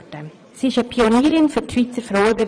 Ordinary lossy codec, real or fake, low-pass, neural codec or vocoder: none; fake; 9.9 kHz; vocoder, 22.05 kHz, 80 mel bands, Vocos